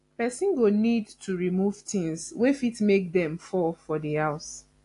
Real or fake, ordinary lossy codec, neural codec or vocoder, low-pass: real; AAC, 48 kbps; none; 10.8 kHz